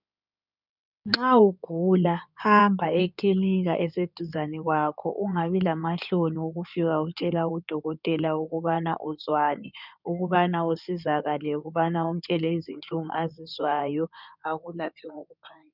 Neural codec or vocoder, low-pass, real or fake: codec, 16 kHz in and 24 kHz out, 2.2 kbps, FireRedTTS-2 codec; 5.4 kHz; fake